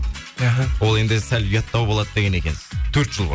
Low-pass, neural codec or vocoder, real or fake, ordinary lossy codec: none; none; real; none